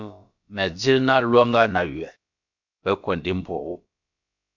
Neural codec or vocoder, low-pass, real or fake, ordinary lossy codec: codec, 16 kHz, about 1 kbps, DyCAST, with the encoder's durations; 7.2 kHz; fake; MP3, 64 kbps